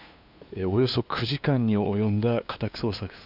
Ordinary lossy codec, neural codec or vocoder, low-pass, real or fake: none; codec, 16 kHz, 2 kbps, FunCodec, trained on LibriTTS, 25 frames a second; 5.4 kHz; fake